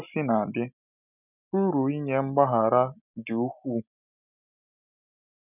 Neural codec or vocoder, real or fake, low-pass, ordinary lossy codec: none; real; 3.6 kHz; none